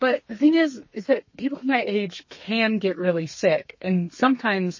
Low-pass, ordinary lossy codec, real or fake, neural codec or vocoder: 7.2 kHz; MP3, 32 kbps; fake; codec, 32 kHz, 1.9 kbps, SNAC